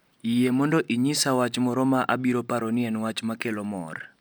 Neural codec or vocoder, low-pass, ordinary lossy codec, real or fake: none; none; none; real